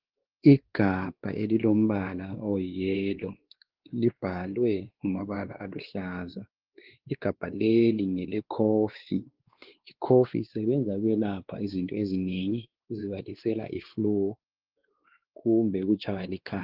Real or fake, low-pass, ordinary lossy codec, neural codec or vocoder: fake; 5.4 kHz; Opus, 16 kbps; codec, 16 kHz, 2 kbps, X-Codec, WavLM features, trained on Multilingual LibriSpeech